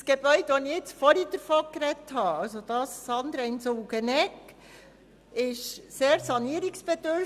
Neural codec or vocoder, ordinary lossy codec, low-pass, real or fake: none; Opus, 64 kbps; 14.4 kHz; real